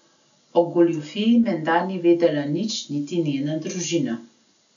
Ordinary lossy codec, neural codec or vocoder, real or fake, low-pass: none; none; real; 7.2 kHz